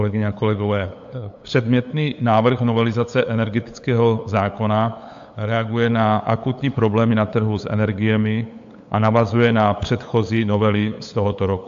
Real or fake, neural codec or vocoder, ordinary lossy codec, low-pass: fake; codec, 16 kHz, 8 kbps, FunCodec, trained on LibriTTS, 25 frames a second; AAC, 64 kbps; 7.2 kHz